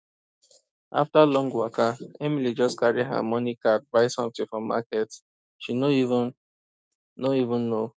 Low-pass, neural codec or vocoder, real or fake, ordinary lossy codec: none; codec, 16 kHz, 6 kbps, DAC; fake; none